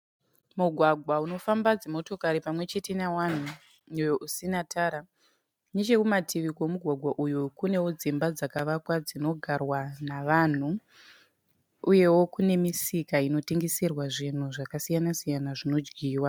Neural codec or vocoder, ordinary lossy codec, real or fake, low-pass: none; MP3, 96 kbps; real; 19.8 kHz